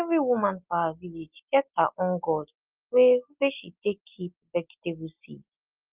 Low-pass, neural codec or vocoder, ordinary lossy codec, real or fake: 3.6 kHz; none; Opus, 32 kbps; real